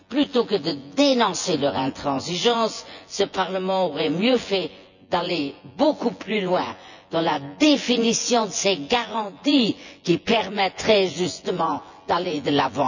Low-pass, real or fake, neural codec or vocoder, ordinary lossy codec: 7.2 kHz; fake; vocoder, 24 kHz, 100 mel bands, Vocos; none